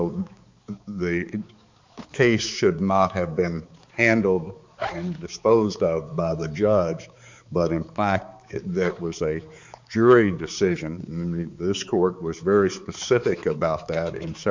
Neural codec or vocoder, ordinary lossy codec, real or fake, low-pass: codec, 16 kHz, 4 kbps, X-Codec, HuBERT features, trained on balanced general audio; MP3, 64 kbps; fake; 7.2 kHz